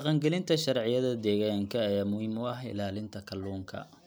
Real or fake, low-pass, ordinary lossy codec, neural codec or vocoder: real; none; none; none